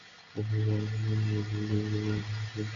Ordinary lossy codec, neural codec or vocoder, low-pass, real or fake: MP3, 48 kbps; none; 7.2 kHz; real